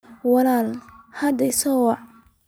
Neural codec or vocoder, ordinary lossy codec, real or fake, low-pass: none; none; real; none